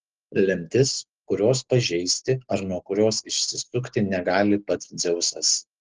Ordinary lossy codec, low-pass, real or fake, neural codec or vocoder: Opus, 16 kbps; 7.2 kHz; real; none